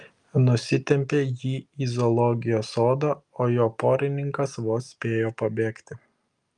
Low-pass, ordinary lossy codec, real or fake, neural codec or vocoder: 10.8 kHz; Opus, 32 kbps; real; none